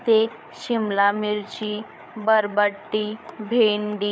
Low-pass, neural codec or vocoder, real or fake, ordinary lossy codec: none; codec, 16 kHz, 8 kbps, FreqCodec, larger model; fake; none